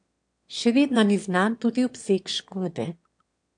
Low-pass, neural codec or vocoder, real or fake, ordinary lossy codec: 9.9 kHz; autoencoder, 22.05 kHz, a latent of 192 numbers a frame, VITS, trained on one speaker; fake; AAC, 64 kbps